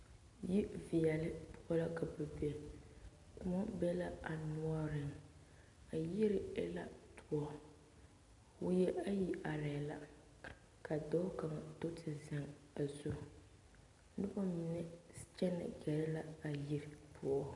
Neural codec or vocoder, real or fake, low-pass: none; real; 10.8 kHz